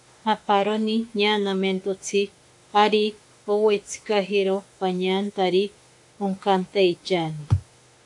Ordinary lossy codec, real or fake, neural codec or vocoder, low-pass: AAC, 64 kbps; fake; autoencoder, 48 kHz, 32 numbers a frame, DAC-VAE, trained on Japanese speech; 10.8 kHz